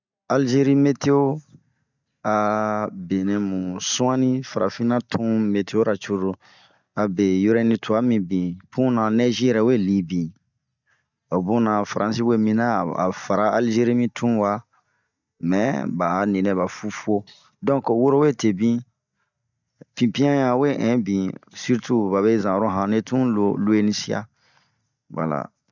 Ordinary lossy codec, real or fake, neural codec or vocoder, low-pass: none; real; none; 7.2 kHz